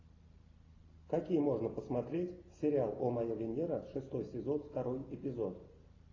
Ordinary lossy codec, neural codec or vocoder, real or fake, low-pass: AAC, 32 kbps; none; real; 7.2 kHz